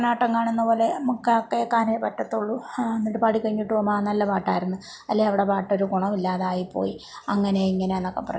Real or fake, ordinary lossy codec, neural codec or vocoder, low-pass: real; none; none; none